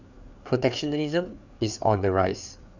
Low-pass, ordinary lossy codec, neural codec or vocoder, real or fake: 7.2 kHz; none; codec, 16 kHz, 4 kbps, FreqCodec, larger model; fake